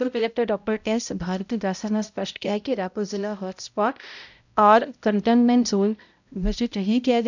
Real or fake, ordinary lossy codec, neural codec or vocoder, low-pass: fake; none; codec, 16 kHz, 0.5 kbps, X-Codec, HuBERT features, trained on balanced general audio; 7.2 kHz